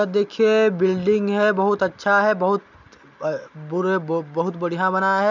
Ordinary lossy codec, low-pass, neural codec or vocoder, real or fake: none; 7.2 kHz; none; real